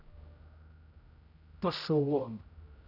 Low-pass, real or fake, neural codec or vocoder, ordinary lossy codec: 5.4 kHz; fake; codec, 16 kHz, 0.5 kbps, X-Codec, HuBERT features, trained on general audio; Opus, 64 kbps